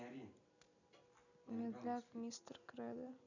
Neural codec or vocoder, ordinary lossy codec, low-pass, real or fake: none; none; 7.2 kHz; real